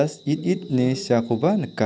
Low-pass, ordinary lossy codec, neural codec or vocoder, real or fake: none; none; none; real